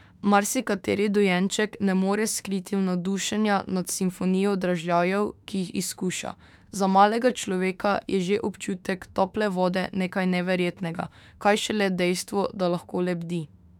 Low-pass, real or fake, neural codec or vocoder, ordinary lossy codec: 19.8 kHz; fake; autoencoder, 48 kHz, 32 numbers a frame, DAC-VAE, trained on Japanese speech; none